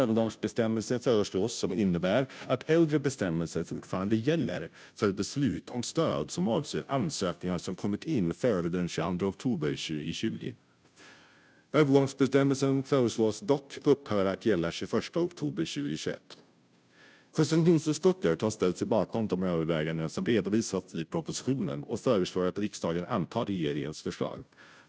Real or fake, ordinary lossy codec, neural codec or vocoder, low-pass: fake; none; codec, 16 kHz, 0.5 kbps, FunCodec, trained on Chinese and English, 25 frames a second; none